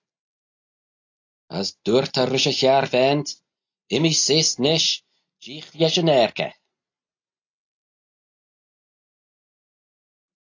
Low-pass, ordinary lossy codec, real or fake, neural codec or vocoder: 7.2 kHz; AAC, 48 kbps; real; none